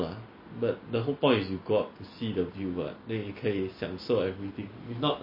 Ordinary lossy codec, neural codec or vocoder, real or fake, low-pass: MP3, 24 kbps; none; real; 5.4 kHz